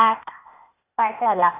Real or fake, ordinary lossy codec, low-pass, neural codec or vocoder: fake; none; 3.6 kHz; codec, 16 kHz, 0.8 kbps, ZipCodec